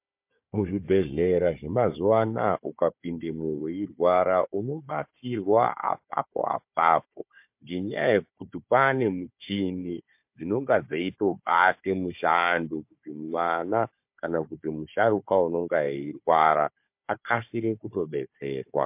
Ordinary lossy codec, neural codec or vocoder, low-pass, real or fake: MP3, 32 kbps; codec, 16 kHz, 4 kbps, FunCodec, trained on Chinese and English, 50 frames a second; 3.6 kHz; fake